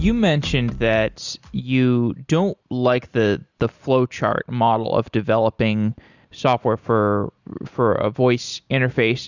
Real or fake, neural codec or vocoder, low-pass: real; none; 7.2 kHz